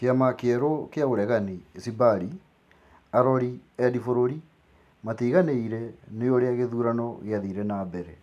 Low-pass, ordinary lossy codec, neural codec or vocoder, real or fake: 14.4 kHz; none; none; real